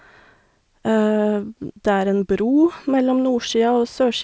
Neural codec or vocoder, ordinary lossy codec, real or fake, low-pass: none; none; real; none